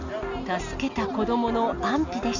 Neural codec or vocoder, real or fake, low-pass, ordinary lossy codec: none; real; 7.2 kHz; none